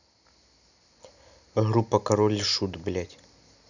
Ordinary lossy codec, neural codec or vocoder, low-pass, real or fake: none; none; 7.2 kHz; real